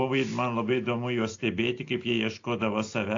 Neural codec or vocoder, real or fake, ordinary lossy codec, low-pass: none; real; AAC, 48 kbps; 7.2 kHz